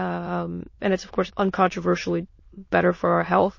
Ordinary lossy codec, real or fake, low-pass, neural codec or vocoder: MP3, 32 kbps; fake; 7.2 kHz; autoencoder, 22.05 kHz, a latent of 192 numbers a frame, VITS, trained on many speakers